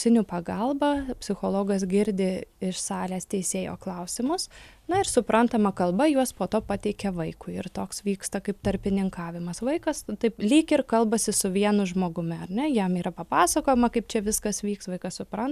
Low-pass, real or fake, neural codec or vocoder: 14.4 kHz; real; none